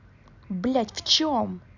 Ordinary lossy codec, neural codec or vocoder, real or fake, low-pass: none; none; real; 7.2 kHz